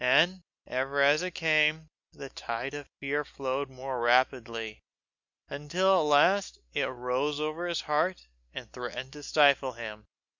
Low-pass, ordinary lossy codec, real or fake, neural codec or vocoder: 7.2 kHz; Opus, 64 kbps; real; none